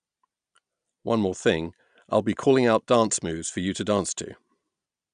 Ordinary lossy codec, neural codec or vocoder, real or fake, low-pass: none; none; real; 9.9 kHz